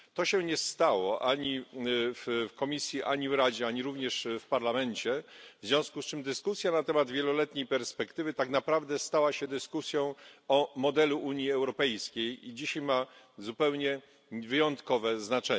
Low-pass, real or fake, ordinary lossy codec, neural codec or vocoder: none; real; none; none